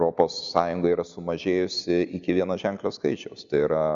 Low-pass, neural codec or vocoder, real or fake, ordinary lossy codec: 7.2 kHz; none; real; Opus, 64 kbps